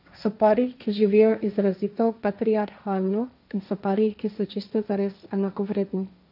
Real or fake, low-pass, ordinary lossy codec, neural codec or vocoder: fake; 5.4 kHz; none; codec, 16 kHz, 1.1 kbps, Voila-Tokenizer